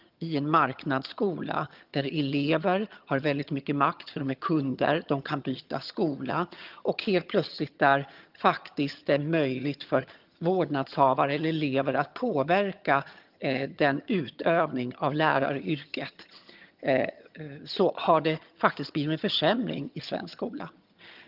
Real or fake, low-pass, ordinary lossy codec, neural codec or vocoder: fake; 5.4 kHz; Opus, 24 kbps; vocoder, 22.05 kHz, 80 mel bands, HiFi-GAN